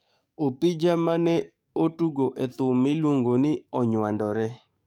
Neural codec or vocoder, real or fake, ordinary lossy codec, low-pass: codec, 44.1 kHz, 7.8 kbps, DAC; fake; none; 19.8 kHz